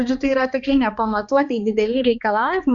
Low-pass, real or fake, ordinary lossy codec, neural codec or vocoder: 7.2 kHz; fake; Opus, 64 kbps; codec, 16 kHz, 2 kbps, X-Codec, HuBERT features, trained on balanced general audio